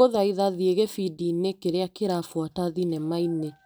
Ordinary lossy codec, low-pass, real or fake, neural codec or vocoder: none; none; real; none